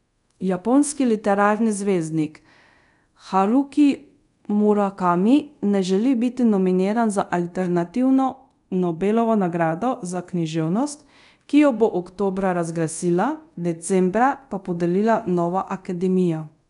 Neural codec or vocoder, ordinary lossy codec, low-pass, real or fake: codec, 24 kHz, 0.5 kbps, DualCodec; none; 10.8 kHz; fake